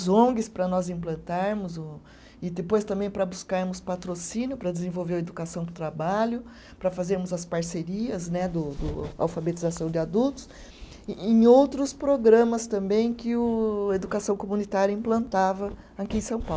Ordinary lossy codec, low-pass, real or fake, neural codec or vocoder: none; none; real; none